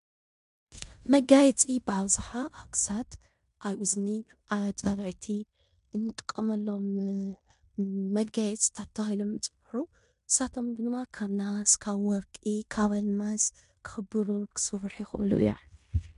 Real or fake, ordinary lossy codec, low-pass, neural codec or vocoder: fake; MP3, 64 kbps; 10.8 kHz; codec, 16 kHz in and 24 kHz out, 0.9 kbps, LongCat-Audio-Codec, fine tuned four codebook decoder